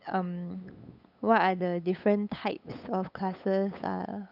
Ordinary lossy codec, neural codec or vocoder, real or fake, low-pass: none; codec, 16 kHz, 8 kbps, FunCodec, trained on LibriTTS, 25 frames a second; fake; 5.4 kHz